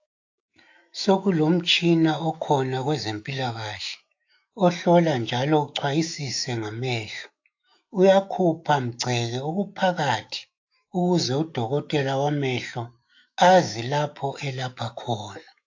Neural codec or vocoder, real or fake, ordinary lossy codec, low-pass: autoencoder, 48 kHz, 128 numbers a frame, DAC-VAE, trained on Japanese speech; fake; AAC, 48 kbps; 7.2 kHz